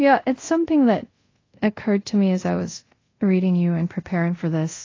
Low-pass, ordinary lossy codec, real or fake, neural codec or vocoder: 7.2 kHz; AAC, 32 kbps; fake; codec, 24 kHz, 0.5 kbps, DualCodec